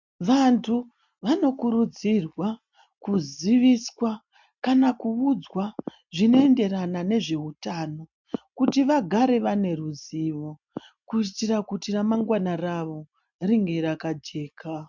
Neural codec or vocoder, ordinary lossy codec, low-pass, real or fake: none; MP3, 64 kbps; 7.2 kHz; real